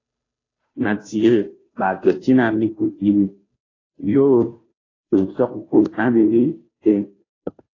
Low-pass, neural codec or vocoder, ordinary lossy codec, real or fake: 7.2 kHz; codec, 16 kHz, 0.5 kbps, FunCodec, trained on Chinese and English, 25 frames a second; AAC, 32 kbps; fake